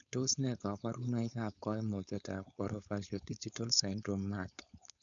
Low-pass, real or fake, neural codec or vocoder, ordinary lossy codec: 7.2 kHz; fake; codec, 16 kHz, 4.8 kbps, FACodec; none